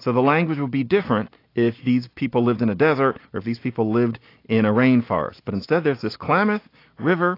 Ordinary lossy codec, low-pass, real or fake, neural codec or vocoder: AAC, 32 kbps; 5.4 kHz; real; none